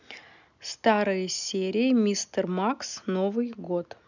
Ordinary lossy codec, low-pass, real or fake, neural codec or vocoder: none; 7.2 kHz; real; none